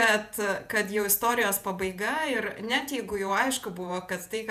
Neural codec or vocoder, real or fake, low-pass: vocoder, 48 kHz, 128 mel bands, Vocos; fake; 14.4 kHz